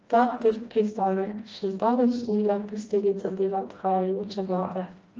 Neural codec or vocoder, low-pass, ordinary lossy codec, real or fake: codec, 16 kHz, 1 kbps, FreqCodec, smaller model; 7.2 kHz; Opus, 24 kbps; fake